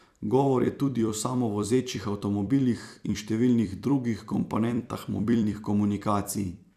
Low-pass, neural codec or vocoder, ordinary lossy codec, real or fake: 14.4 kHz; vocoder, 44.1 kHz, 128 mel bands every 256 samples, BigVGAN v2; none; fake